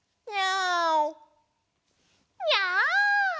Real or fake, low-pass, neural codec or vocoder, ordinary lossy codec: real; none; none; none